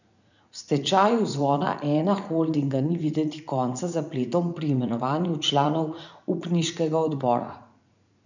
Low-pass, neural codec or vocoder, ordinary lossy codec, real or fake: 7.2 kHz; vocoder, 44.1 kHz, 80 mel bands, Vocos; none; fake